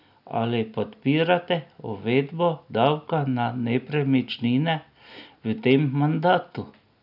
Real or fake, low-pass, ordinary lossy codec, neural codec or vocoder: real; 5.4 kHz; none; none